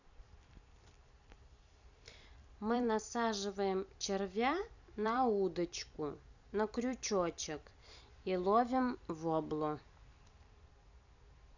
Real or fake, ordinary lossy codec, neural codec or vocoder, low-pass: fake; none; vocoder, 44.1 kHz, 128 mel bands every 256 samples, BigVGAN v2; 7.2 kHz